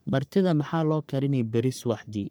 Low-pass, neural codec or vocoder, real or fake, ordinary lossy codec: none; codec, 44.1 kHz, 3.4 kbps, Pupu-Codec; fake; none